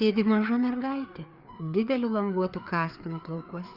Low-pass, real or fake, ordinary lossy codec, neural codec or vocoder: 5.4 kHz; fake; Opus, 64 kbps; codec, 16 kHz, 4 kbps, FreqCodec, larger model